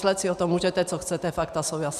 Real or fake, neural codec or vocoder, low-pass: real; none; 14.4 kHz